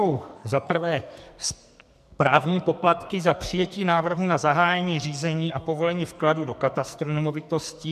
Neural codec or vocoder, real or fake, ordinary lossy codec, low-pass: codec, 44.1 kHz, 2.6 kbps, SNAC; fake; MP3, 96 kbps; 14.4 kHz